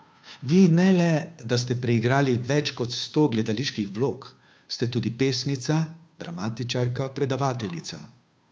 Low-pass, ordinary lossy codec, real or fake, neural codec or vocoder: none; none; fake; codec, 16 kHz, 2 kbps, FunCodec, trained on Chinese and English, 25 frames a second